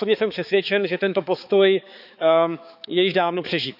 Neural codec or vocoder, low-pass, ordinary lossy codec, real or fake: codec, 16 kHz, 4 kbps, X-Codec, HuBERT features, trained on balanced general audio; 5.4 kHz; none; fake